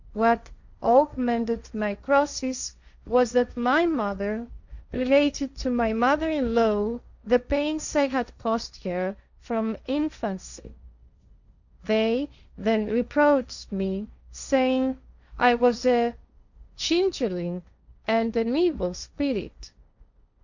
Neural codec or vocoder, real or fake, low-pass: codec, 16 kHz, 1.1 kbps, Voila-Tokenizer; fake; 7.2 kHz